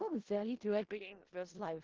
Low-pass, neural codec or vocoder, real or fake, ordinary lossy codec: 7.2 kHz; codec, 16 kHz in and 24 kHz out, 0.4 kbps, LongCat-Audio-Codec, four codebook decoder; fake; Opus, 16 kbps